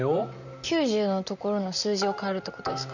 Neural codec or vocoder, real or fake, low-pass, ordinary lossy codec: vocoder, 44.1 kHz, 128 mel bands, Pupu-Vocoder; fake; 7.2 kHz; none